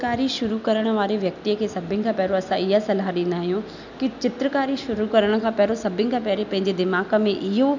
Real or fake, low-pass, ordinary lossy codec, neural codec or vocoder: real; 7.2 kHz; none; none